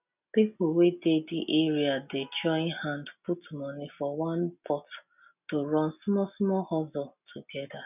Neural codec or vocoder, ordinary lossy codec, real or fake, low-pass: none; none; real; 3.6 kHz